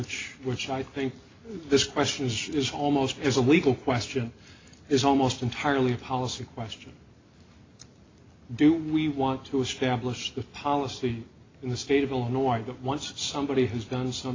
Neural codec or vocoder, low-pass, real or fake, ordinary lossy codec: none; 7.2 kHz; real; AAC, 32 kbps